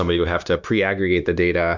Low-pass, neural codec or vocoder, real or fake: 7.2 kHz; codec, 16 kHz, 0.9 kbps, LongCat-Audio-Codec; fake